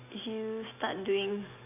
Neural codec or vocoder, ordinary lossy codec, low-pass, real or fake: none; none; 3.6 kHz; real